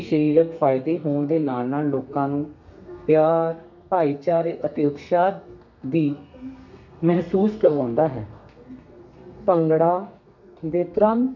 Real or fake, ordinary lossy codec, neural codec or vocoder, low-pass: fake; none; codec, 32 kHz, 1.9 kbps, SNAC; 7.2 kHz